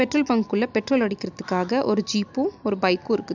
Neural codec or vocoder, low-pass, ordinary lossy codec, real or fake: none; 7.2 kHz; none; real